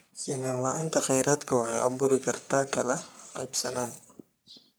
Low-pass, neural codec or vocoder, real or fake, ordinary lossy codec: none; codec, 44.1 kHz, 3.4 kbps, Pupu-Codec; fake; none